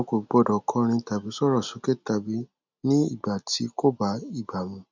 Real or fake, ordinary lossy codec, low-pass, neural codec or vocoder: real; none; 7.2 kHz; none